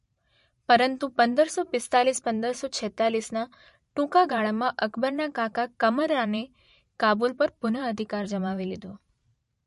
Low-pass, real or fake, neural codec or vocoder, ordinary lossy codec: 14.4 kHz; fake; vocoder, 44.1 kHz, 128 mel bands every 512 samples, BigVGAN v2; MP3, 48 kbps